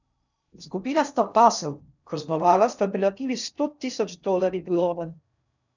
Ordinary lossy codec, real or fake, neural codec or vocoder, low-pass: none; fake; codec, 16 kHz in and 24 kHz out, 0.6 kbps, FocalCodec, streaming, 4096 codes; 7.2 kHz